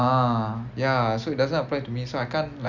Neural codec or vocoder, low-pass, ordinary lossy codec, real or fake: none; 7.2 kHz; none; real